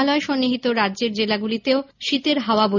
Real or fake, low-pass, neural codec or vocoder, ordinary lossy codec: real; 7.2 kHz; none; none